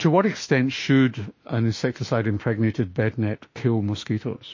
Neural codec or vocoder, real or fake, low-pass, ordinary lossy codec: autoencoder, 48 kHz, 32 numbers a frame, DAC-VAE, trained on Japanese speech; fake; 7.2 kHz; MP3, 32 kbps